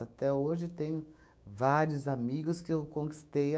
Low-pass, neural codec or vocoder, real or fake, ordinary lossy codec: none; codec, 16 kHz, 6 kbps, DAC; fake; none